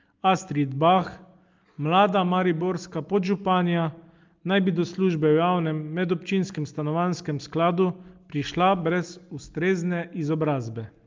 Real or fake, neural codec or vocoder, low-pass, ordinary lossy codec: real; none; 7.2 kHz; Opus, 32 kbps